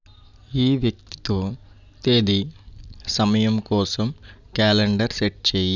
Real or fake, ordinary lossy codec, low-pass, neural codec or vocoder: real; none; 7.2 kHz; none